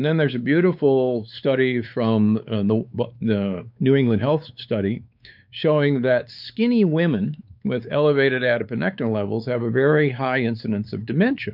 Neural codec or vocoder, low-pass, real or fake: codec, 16 kHz, 4 kbps, X-Codec, WavLM features, trained on Multilingual LibriSpeech; 5.4 kHz; fake